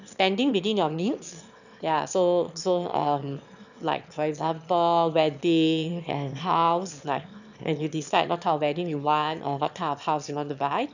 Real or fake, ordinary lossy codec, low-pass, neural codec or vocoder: fake; none; 7.2 kHz; autoencoder, 22.05 kHz, a latent of 192 numbers a frame, VITS, trained on one speaker